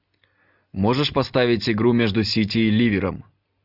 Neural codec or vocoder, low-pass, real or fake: none; 5.4 kHz; real